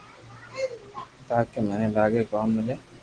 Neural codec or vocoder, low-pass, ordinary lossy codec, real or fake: none; 9.9 kHz; Opus, 16 kbps; real